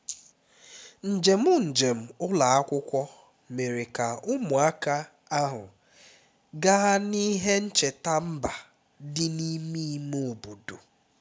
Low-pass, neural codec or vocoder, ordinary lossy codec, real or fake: none; none; none; real